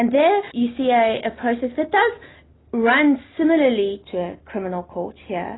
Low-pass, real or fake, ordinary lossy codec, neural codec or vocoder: 7.2 kHz; real; AAC, 16 kbps; none